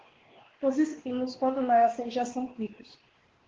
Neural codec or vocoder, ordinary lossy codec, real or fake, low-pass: codec, 16 kHz, 2 kbps, X-Codec, WavLM features, trained on Multilingual LibriSpeech; Opus, 16 kbps; fake; 7.2 kHz